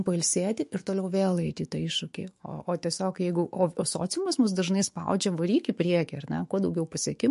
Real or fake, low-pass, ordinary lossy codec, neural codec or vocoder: fake; 14.4 kHz; MP3, 48 kbps; autoencoder, 48 kHz, 128 numbers a frame, DAC-VAE, trained on Japanese speech